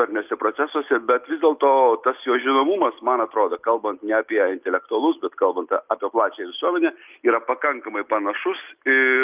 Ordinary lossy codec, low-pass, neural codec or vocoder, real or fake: Opus, 24 kbps; 3.6 kHz; none; real